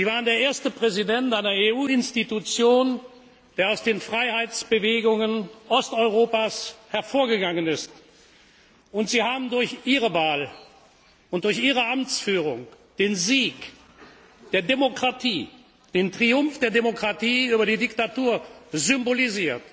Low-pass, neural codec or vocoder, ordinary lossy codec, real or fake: none; none; none; real